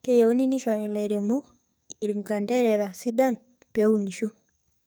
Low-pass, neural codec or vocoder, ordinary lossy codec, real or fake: none; codec, 44.1 kHz, 2.6 kbps, SNAC; none; fake